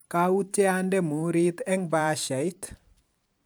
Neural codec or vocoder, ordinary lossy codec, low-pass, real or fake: vocoder, 44.1 kHz, 128 mel bands every 512 samples, BigVGAN v2; none; none; fake